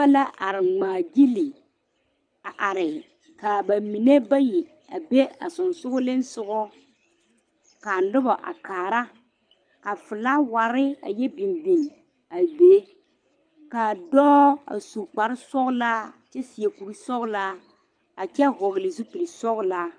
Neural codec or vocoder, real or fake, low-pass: codec, 24 kHz, 6 kbps, HILCodec; fake; 9.9 kHz